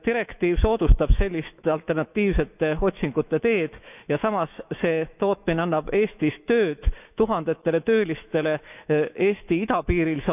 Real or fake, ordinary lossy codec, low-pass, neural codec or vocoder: fake; none; 3.6 kHz; autoencoder, 48 kHz, 128 numbers a frame, DAC-VAE, trained on Japanese speech